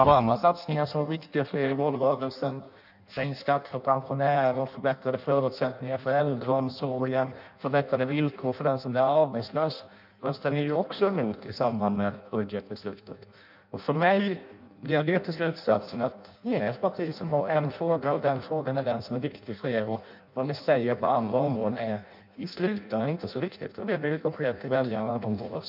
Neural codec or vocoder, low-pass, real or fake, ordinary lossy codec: codec, 16 kHz in and 24 kHz out, 0.6 kbps, FireRedTTS-2 codec; 5.4 kHz; fake; none